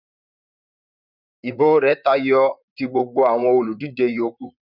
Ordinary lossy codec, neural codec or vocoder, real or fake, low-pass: none; vocoder, 44.1 kHz, 128 mel bands, Pupu-Vocoder; fake; 5.4 kHz